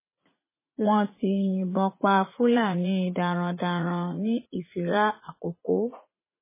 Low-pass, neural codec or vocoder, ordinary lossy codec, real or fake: 3.6 kHz; vocoder, 44.1 kHz, 80 mel bands, Vocos; MP3, 16 kbps; fake